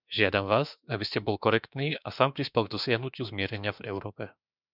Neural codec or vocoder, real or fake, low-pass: autoencoder, 48 kHz, 32 numbers a frame, DAC-VAE, trained on Japanese speech; fake; 5.4 kHz